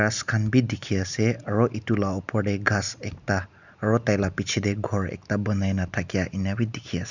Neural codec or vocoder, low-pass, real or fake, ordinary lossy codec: none; 7.2 kHz; real; none